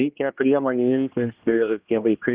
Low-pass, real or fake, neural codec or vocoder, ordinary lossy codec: 3.6 kHz; fake; codec, 16 kHz, 1 kbps, X-Codec, HuBERT features, trained on general audio; Opus, 24 kbps